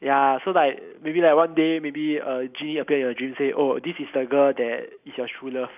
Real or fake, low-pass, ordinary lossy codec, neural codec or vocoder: real; 3.6 kHz; none; none